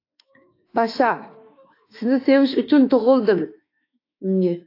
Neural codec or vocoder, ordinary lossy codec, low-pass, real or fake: autoencoder, 48 kHz, 32 numbers a frame, DAC-VAE, trained on Japanese speech; AAC, 32 kbps; 5.4 kHz; fake